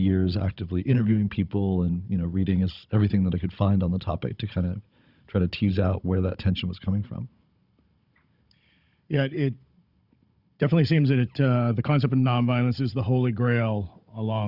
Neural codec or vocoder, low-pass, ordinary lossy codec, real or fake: codec, 16 kHz, 16 kbps, FunCodec, trained on Chinese and English, 50 frames a second; 5.4 kHz; Opus, 64 kbps; fake